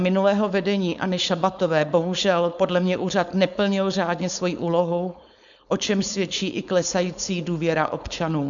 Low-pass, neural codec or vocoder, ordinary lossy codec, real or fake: 7.2 kHz; codec, 16 kHz, 4.8 kbps, FACodec; MP3, 96 kbps; fake